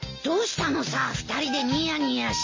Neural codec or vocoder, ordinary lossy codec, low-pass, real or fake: none; MP3, 32 kbps; 7.2 kHz; real